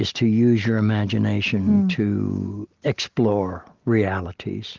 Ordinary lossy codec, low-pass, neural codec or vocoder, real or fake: Opus, 32 kbps; 7.2 kHz; none; real